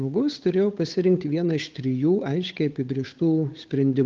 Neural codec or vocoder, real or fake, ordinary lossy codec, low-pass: codec, 16 kHz, 8 kbps, FunCodec, trained on Chinese and English, 25 frames a second; fake; Opus, 32 kbps; 7.2 kHz